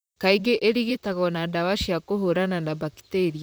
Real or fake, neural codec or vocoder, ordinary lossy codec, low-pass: fake; vocoder, 44.1 kHz, 128 mel bands, Pupu-Vocoder; none; none